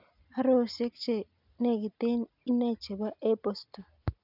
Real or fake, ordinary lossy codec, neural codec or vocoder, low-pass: real; none; none; 5.4 kHz